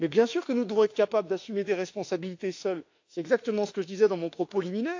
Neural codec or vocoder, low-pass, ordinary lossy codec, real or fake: autoencoder, 48 kHz, 32 numbers a frame, DAC-VAE, trained on Japanese speech; 7.2 kHz; AAC, 48 kbps; fake